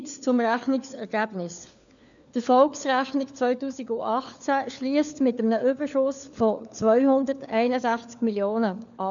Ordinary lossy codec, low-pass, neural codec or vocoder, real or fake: none; 7.2 kHz; codec, 16 kHz, 4 kbps, FunCodec, trained on LibriTTS, 50 frames a second; fake